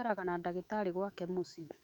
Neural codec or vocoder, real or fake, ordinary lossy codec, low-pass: autoencoder, 48 kHz, 128 numbers a frame, DAC-VAE, trained on Japanese speech; fake; none; 19.8 kHz